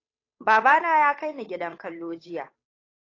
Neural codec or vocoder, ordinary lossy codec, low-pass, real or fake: codec, 16 kHz, 8 kbps, FunCodec, trained on Chinese and English, 25 frames a second; AAC, 32 kbps; 7.2 kHz; fake